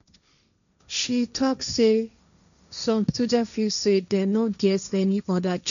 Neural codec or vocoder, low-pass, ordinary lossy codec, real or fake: codec, 16 kHz, 1.1 kbps, Voila-Tokenizer; 7.2 kHz; none; fake